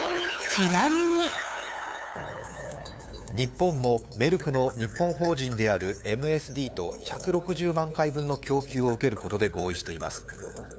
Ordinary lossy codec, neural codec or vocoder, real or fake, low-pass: none; codec, 16 kHz, 2 kbps, FunCodec, trained on LibriTTS, 25 frames a second; fake; none